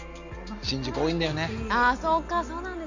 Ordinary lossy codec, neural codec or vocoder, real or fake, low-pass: none; none; real; 7.2 kHz